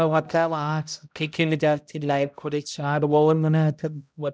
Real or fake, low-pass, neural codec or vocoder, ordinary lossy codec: fake; none; codec, 16 kHz, 0.5 kbps, X-Codec, HuBERT features, trained on balanced general audio; none